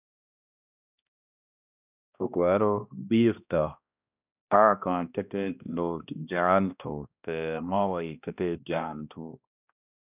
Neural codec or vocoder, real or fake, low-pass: codec, 16 kHz, 1 kbps, X-Codec, HuBERT features, trained on balanced general audio; fake; 3.6 kHz